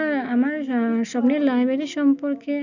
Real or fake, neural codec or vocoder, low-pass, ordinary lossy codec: real; none; 7.2 kHz; none